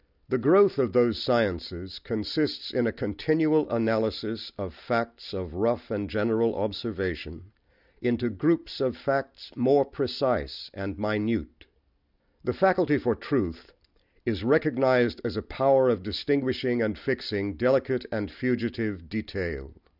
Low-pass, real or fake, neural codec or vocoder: 5.4 kHz; real; none